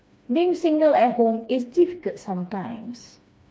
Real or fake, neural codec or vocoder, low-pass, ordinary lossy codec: fake; codec, 16 kHz, 2 kbps, FreqCodec, smaller model; none; none